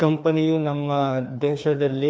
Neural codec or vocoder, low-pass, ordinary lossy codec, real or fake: codec, 16 kHz, 2 kbps, FreqCodec, larger model; none; none; fake